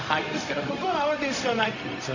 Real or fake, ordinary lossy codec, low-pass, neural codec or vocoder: fake; none; 7.2 kHz; codec, 16 kHz, 0.4 kbps, LongCat-Audio-Codec